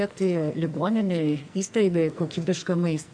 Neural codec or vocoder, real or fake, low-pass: codec, 44.1 kHz, 2.6 kbps, SNAC; fake; 9.9 kHz